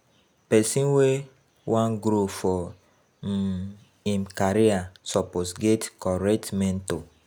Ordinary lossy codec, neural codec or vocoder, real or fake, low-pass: none; none; real; none